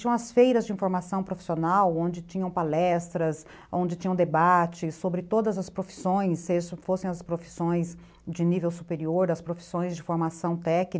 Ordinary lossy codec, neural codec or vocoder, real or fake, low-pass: none; none; real; none